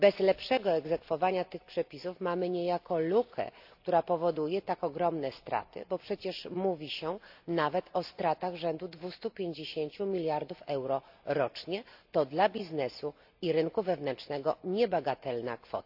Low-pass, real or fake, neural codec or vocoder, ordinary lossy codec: 5.4 kHz; real; none; AAC, 48 kbps